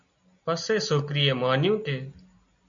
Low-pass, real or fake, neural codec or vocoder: 7.2 kHz; real; none